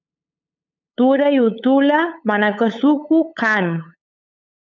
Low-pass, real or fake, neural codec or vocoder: 7.2 kHz; fake; codec, 16 kHz, 8 kbps, FunCodec, trained on LibriTTS, 25 frames a second